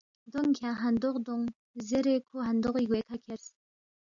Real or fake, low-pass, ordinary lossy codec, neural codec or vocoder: real; 7.2 kHz; MP3, 64 kbps; none